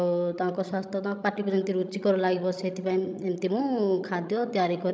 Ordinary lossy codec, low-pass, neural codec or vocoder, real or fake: none; none; codec, 16 kHz, 16 kbps, FreqCodec, larger model; fake